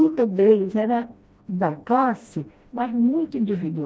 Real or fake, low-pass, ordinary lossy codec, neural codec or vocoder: fake; none; none; codec, 16 kHz, 1 kbps, FreqCodec, smaller model